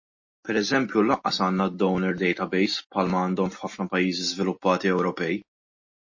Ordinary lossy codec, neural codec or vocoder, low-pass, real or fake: MP3, 32 kbps; none; 7.2 kHz; real